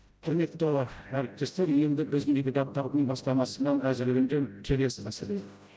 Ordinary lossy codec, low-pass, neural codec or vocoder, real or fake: none; none; codec, 16 kHz, 0.5 kbps, FreqCodec, smaller model; fake